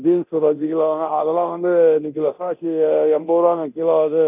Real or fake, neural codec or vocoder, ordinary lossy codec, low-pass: fake; codec, 24 kHz, 0.9 kbps, DualCodec; none; 3.6 kHz